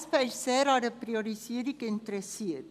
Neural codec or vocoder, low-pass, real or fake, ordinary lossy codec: vocoder, 44.1 kHz, 128 mel bands every 256 samples, BigVGAN v2; 14.4 kHz; fake; none